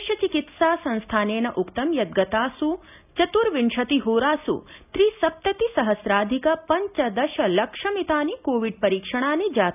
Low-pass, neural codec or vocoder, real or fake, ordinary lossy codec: 3.6 kHz; none; real; none